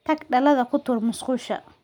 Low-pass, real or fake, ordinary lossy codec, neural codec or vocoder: 19.8 kHz; real; none; none